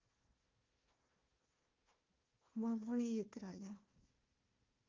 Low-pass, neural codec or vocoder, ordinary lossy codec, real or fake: 7.2 kHz; codec, 16 kHz, 1 kbps, FunCodec, trained on Chinese and English, 50 frames a second; Opus, 24 kbps; fake